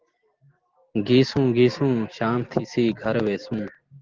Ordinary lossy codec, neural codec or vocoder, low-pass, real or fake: Opus, 16 kbps; none; 7.2 kHz; real